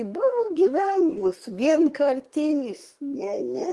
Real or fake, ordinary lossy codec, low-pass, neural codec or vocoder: fake; Opus, 24 kbps; 10.8 kHz; codec, 24 kHz, 1 kbps, SNAC